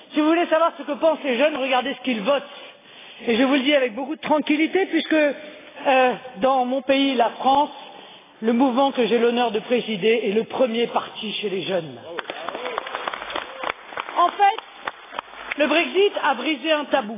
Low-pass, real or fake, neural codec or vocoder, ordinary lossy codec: 3.6 kHz; real; none; AAC, 16 kbps